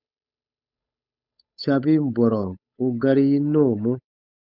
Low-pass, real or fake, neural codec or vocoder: 5.4 kHz; fake; codec, 16 kHz, 8 kbps, FunCodec, trained on Chinese and English, 25 frames a second